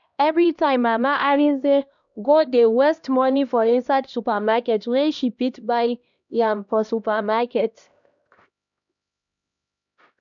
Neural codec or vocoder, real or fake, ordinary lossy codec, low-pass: codec, 16 kHz, 1 kbps, X-Codec, HuBERT features, trained on LibriSpeech; fake; MP3, 96 kbps; 7.2 kHz